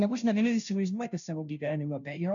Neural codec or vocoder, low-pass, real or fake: codec, 16 kHz, 0.5 kbps, FunCodec, trained on Chinese and English, 25 frames a second; 7.2 kHz; fake